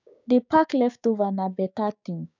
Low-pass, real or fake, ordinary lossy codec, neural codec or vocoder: 7.2 kHz; fake; none; codec, 16 kHz, 6 kbps, DAC